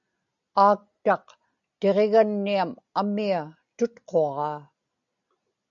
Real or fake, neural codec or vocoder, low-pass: real; none; 7.2 kHz